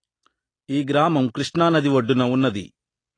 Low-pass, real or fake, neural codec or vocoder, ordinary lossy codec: 9.9 kHz; real; none; AAC, 32 kbps